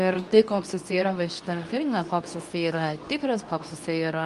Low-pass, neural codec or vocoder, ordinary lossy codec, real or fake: 10.8 kHz; codec, 24 kHz, 0.9 kbps, WavTokenizer, medium speech release version 2; Opus, 32 kbps; fake